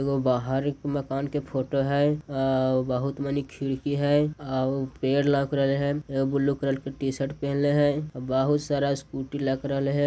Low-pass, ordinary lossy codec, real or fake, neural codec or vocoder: none; none; real; none